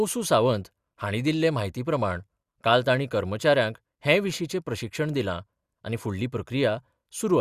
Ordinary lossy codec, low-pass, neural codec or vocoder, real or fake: Opus, 64 kbps; 14.4 kHz; none; real